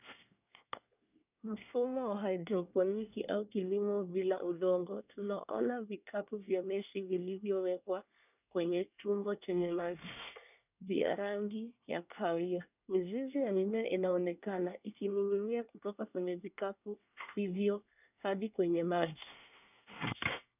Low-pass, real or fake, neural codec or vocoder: 3.6 kHz; fake; codec, 24 kHz, 1 kbps, SNAC